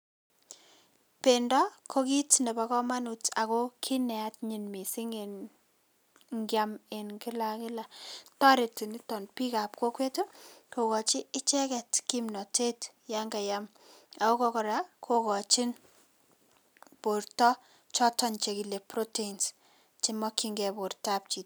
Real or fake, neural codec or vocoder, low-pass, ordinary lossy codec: real; none; none; none